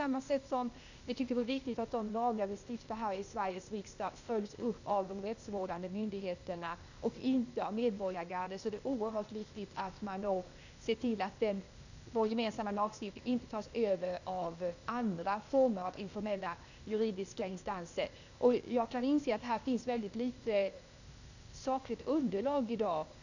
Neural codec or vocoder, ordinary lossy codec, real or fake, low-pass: codec, 16 kHz, 0.8 kbps, ZipCodec; none; fake; 7.2 kHz